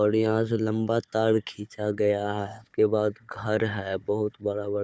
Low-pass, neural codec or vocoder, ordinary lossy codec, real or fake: none; codec, 16 kHz, 4 kbps, FunCodec, trained on Chinese and English, 50 frames a second; none; fake